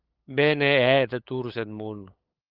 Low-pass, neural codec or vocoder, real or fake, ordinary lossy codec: 5.4 kHz; codec, 16 kHz, 8 kbps, FunCodec, trained on LibriTTS, 25 frames a second; fake; Opus, 32 kbps